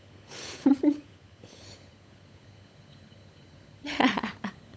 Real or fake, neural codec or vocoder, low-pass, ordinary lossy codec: fake; codec, 16 kHz, 16 kbps, FunCodec, trained on LibriTTS, 50 frames a second; none; none